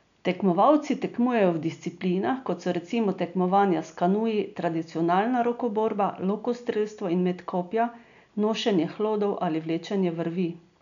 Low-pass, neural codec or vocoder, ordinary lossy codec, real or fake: 7.2 kHz; none; none; real